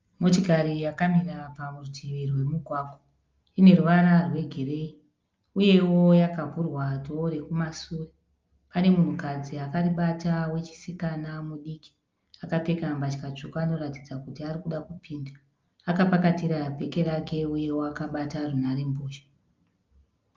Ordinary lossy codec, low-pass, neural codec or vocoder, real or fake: Opus, 32 kbps; 7.2 kHz; none; real